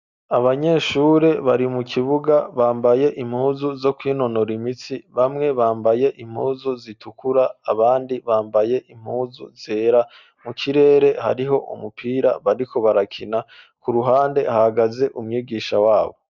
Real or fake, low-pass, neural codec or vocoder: real; 7.2 kHz; none